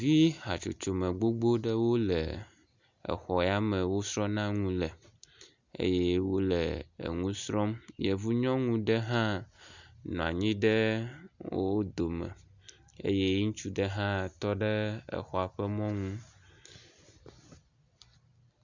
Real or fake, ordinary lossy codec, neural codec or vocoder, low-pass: real; Opus, 64 kbps; none; 7.2 kHz